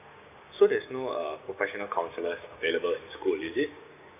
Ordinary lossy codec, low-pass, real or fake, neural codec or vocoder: none; 3.6 kHz; fake; codec, 16 kHz, 6 kbps, DAC